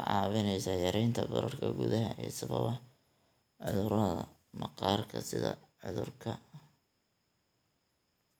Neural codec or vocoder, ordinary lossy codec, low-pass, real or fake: none; none; none; real